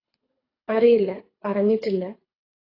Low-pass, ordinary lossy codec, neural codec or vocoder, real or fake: 5.4 kHz; AAC, 24 kbps; codec, 24 kHz, 3 kbps, HILCodec; fake